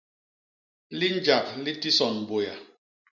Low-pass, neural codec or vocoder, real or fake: 7.2 kHz; none; real